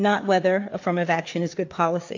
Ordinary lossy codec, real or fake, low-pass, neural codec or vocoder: AAC, 48 kbps; fake; 7.2 kHz; codec, 16 kHz, 4 kbps, FreqCodec, larger model